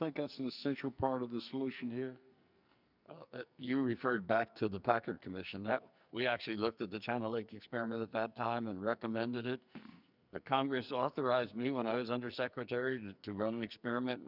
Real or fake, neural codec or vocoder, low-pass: fake; codec, 44.1 kHz, 2.6 kbps, SNAC; 5.4 kHz